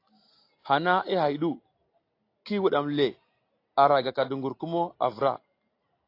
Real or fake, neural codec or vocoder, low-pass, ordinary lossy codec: real; none; 5.4 kHz; AAC, 32 kbps